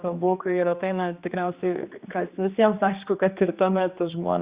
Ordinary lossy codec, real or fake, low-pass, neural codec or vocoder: Opus, 64 kbps; fake; 3.6 kHz; codec, 16 kHz, 2 kbps, X-Codec, HuBERT features, trained on general audio